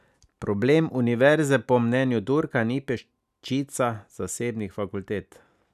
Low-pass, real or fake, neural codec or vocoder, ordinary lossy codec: 14.4 kHz; real; none; none